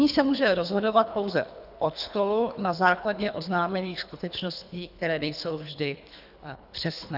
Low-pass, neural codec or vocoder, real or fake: 5.4 kHz; codec, 24 kHz, 3 kbps, HILCodec; fake